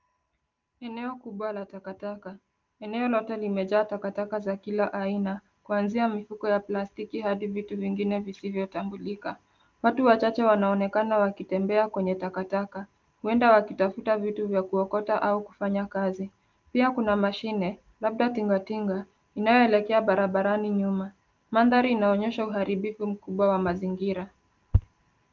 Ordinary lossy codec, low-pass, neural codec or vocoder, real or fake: Opus, 32 kbps; 7.2 kHz; none; real